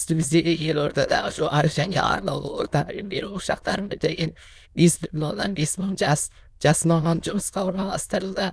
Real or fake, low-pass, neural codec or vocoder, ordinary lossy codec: fake; none; autoencoder, 22.05 kHz, a latent of 192 numbers a frame, VITS, trained on many speakers; none